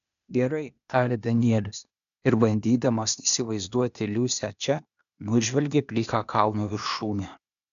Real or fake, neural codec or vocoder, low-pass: fake; codec, 16 kHz, 0.8 kbps, ZipCodec; 7.2 kHz